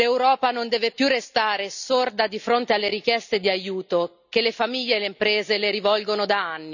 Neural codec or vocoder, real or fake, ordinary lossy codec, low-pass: none; real; none; 7.2 kHz